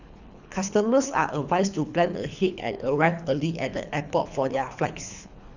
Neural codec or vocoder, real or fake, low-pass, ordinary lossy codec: codec, 24 kHz, 3 kbps, HILCodec; fake; 7.2 kHz; none